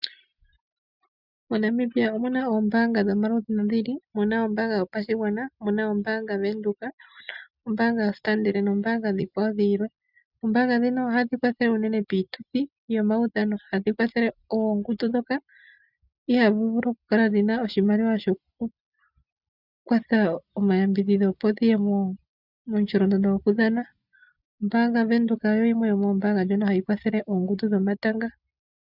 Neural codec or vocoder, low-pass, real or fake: none; 5.4 kHz; real